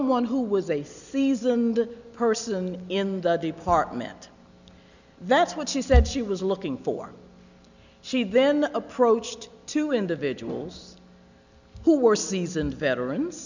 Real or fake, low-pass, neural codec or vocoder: real; 7.2 kHz; none